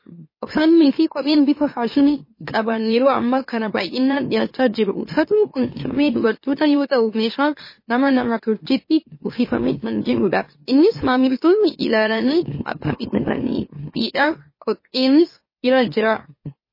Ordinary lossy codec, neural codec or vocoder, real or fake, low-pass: MP3, 24 kbps; autoencoder, 44.1 kHz, a latent of 192 numbers a frame, MeloTTS; fake; 5.4 kHz